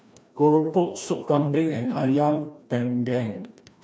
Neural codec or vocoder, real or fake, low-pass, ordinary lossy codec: codec, 16 kHz, 1 kbps, FreqCodec, larger model; fake; none; none